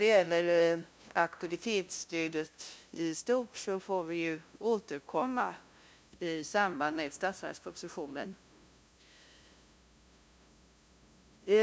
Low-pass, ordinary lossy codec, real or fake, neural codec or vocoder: none; none; fake; codec, 16 kHz, 0.5 kbps, FunCodec, trained on LibriTTS, 25 frames a second